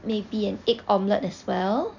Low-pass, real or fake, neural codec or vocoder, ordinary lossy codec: 7.2 kHz; real; none; none